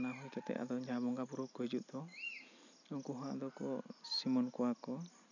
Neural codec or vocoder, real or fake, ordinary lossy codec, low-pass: none; real; none; 7.2 kHz